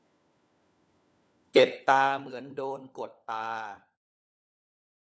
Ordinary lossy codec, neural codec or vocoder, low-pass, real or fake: none; codec, 16 kHz, 4 kbps, FunCodec, trained on LibriTTS, 50 frames a second; none; fake